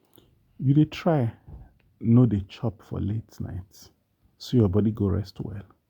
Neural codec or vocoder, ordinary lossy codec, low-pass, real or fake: none; Opus, 64 kbps; 19.8 kHz; real